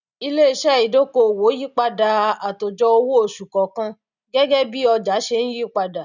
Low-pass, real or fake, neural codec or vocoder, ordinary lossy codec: 7.2 kHz; real; none; none